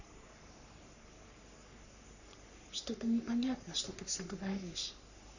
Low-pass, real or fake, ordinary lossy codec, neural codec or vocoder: 7.2 kHz; fake; none; codec, 44.1 kHz, 3.4 kbps, Pupu-Codec